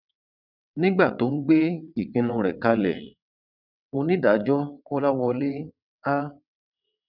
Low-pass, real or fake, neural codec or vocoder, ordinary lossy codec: 5.4 kHz; fake; vocoder, 22.05 kHz, 80 mel bands, WaveNeXt; none